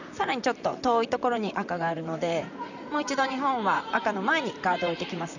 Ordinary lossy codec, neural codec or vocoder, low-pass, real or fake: none; vocoder, 44.1 kHz, 128 mel bands, Pupu-Vocoder; 7.2 kHz; fake